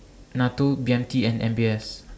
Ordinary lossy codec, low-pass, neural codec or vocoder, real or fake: none; none; none; real